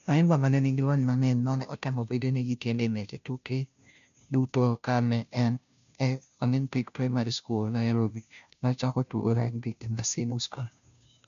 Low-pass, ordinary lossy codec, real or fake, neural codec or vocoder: 7.2 kHz; none; fake; codec, 16 kHz, 0.5 kbps, FunCodec, trained on Chinese and English, 25 frames a second